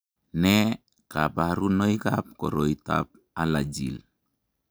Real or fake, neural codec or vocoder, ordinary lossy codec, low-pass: real; none; none; none